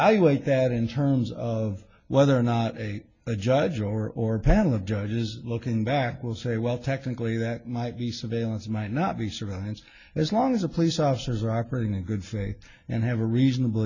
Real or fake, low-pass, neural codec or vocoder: real; 7.2 kHz; none